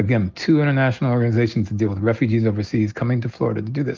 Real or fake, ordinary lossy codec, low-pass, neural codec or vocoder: real; Opus, 24 kbps; 7.2 kHz; none